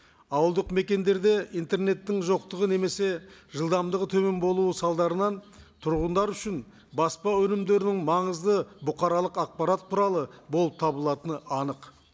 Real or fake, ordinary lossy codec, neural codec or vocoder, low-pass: real; none; none; none